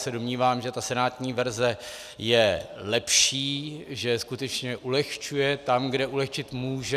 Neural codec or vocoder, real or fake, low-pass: none; real; 14.4 kHz